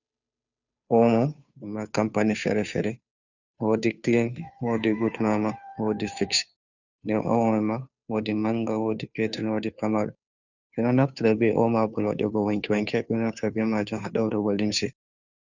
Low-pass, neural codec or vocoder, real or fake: 7.2 kHz; codec, 16 kHz, 2 kbps, FunCodec, trained on Chinese and English, 25 frames a second; fake